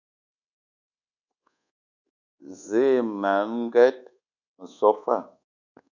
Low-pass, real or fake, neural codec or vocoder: 7.2 kHz; fake; codec, 24 kHz, 1.2 kbps, DualCodec